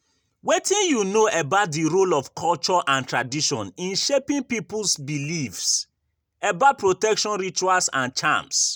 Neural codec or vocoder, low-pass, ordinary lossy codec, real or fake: none; none; none; real